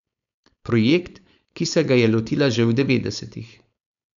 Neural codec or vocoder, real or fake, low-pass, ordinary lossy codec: codec, 16 kHz, 4.8 kbps, FACodec; fake; 7.2 kHz; none